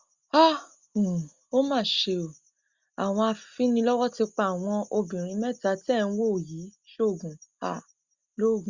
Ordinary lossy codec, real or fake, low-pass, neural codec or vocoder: Opus, 64 kbps; real; 7.2 kHz; none